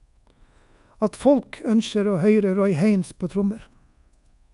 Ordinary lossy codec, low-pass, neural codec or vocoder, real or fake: none; 10.8 kHz; codec, 24 kHz, 1.2 kbps, DualCodec; fake